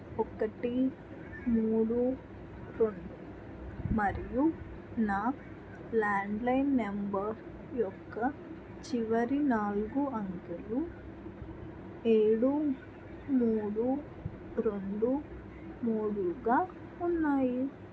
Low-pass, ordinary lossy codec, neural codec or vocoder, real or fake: none; none; none; real